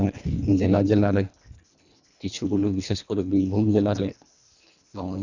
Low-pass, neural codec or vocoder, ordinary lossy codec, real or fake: 7.2 kHz; codec, 24 kHz, 1.5 kbps, HILCodec; none; fake